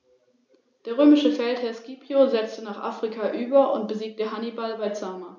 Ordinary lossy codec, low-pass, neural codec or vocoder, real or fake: none; none; none; real